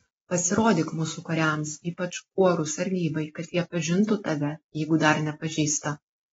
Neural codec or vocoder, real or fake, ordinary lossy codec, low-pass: none; real; AAC, 24 kbps; 19.8 kHz